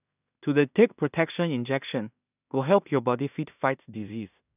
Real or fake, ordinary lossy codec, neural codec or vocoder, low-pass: fake; none; codec, 16 kHz in and 24 kHz out, 0.4 kbps, LongCat-Audio-Codec, two codebook decoder; 3.6 kHz